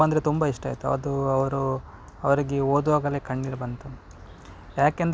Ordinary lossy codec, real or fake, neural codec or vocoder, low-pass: none; real; none; none